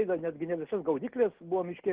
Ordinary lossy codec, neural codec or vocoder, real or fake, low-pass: Opus, 16 kbps; none; real; 3.6 kHz